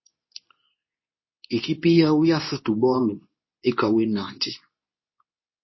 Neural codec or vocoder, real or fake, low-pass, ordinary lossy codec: codec, 24 kHz, 0.9 kbps, WavTokenizer, medium speech release version 2; fake; 7.2 kHz; MP3, 24 kbps